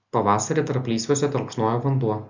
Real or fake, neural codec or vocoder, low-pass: real; none; 7.2 kHz